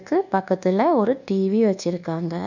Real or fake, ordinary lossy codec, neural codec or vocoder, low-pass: fake; none; codec, 24 kHz, 1.2 kbps, DualCodec; 7.2 kHz